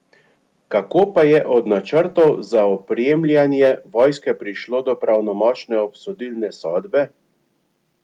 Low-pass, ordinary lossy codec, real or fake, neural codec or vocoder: 19.8 kHz; Opus, 32 kbps; real; none